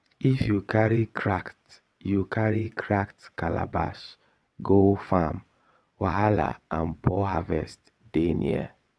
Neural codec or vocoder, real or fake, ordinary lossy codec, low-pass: vocoder, 22.05 kHz, 80 mel bands, WaveNeXt; fake; none; none